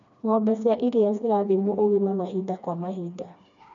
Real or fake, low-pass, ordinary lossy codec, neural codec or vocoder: fake; 7.2 kHz; none; codec, 16 kHz, 2 kbps, FreqCodec, smaller model